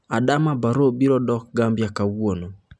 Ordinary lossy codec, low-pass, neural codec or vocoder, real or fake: none; none; none; real